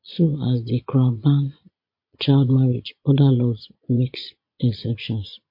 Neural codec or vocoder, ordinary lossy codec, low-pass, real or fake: vocoder, 22.05 kHz, 80 mel bands, Vocos; MP3, 32 kbps; 5.4 kHz; fake